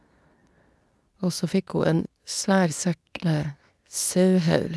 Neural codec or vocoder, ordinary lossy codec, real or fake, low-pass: codec, 24 kHz, 0.9 kbps, WavTokenizer, medium speech release version 2; none; fake; none